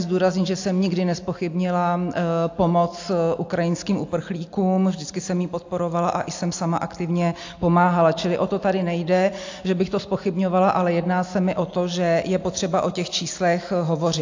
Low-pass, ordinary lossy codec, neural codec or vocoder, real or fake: 7.2 kHz; AAC, 48 kbps; none; real